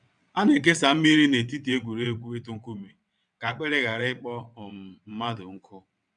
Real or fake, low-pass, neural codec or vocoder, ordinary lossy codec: fake; 9.9 kHz; vocoder, 22.05 kHz, 80 mel bands, WaveNeXt; none